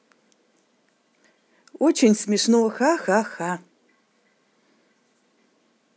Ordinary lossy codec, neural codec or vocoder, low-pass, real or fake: none; none; none; real